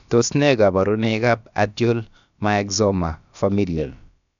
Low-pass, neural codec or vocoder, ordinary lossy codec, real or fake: 7.2 kHz; codec, 16 kHz, about 1 kbps, DyCAST, with the encoder's durations; none; fake